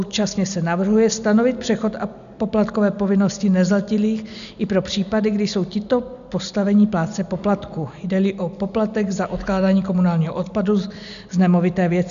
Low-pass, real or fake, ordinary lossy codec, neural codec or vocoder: 7.2 kHz; real; MP3, 96 kbps; none